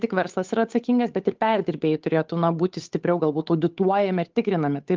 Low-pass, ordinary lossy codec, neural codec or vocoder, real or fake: 7.2 kHz; Opus, 24 kbps; codec, 16 kHz, 8 kbps, FunCodec, trained on Chinese and English, 25 frames a second; fake